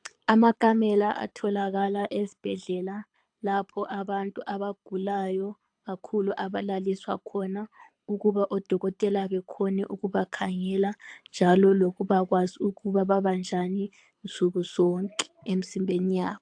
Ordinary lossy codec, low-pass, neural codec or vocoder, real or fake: AAC, 64 kbps; 9.9 kHz; codec, 24 kHz, 6 kbps, HILCodec; fake